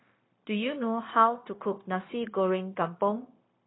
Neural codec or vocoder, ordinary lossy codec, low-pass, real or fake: codec, 16 kHz, 0.9 kbps, LongCat-Audio-Codec; AAC, 16 kbps; 7.2 kHz; fake